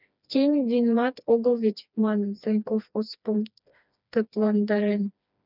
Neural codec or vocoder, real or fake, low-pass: codec, 16 kHz, 2 kbps, FreqCodec, smaller model; fake; 5.4 kHz